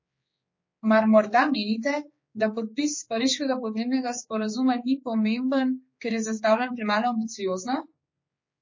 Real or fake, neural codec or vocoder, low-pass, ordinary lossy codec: fake; codec, 16 kHz, 4 kbps, X-Codec, HuBERT features, trained on general audio; 7.2 kHz; MP3, 32 kbps